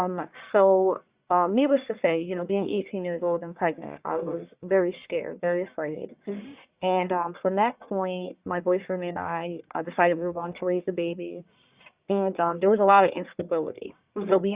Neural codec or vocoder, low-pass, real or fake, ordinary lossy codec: codec, 44.1 kHz, 1.7 kbps, Pupu-Codec; 3.6 kHz; fake; Opus, 64 kbps